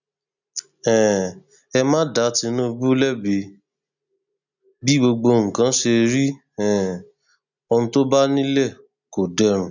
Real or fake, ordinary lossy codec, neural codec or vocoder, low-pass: real; none; none; 7.2 kHz